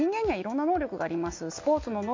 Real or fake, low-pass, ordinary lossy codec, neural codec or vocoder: real; 7.2 kHz; MP3, 48 kbps; none